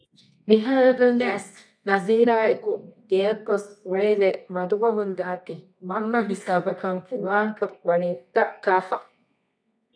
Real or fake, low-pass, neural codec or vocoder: fake; 9.9 kHz; codec, 24 kHz, 0.9 kbps, WavTokenizer, medium music audio release